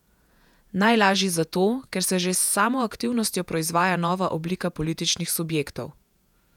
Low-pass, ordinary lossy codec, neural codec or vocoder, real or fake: 19.8 kHz; none; vocoder, 48 kHz, 128 mel bands, Vocos; fake